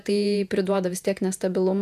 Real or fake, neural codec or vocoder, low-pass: fake; vocoder, 48 kHz, 128 mel bands, Vocos; 14.4 kHz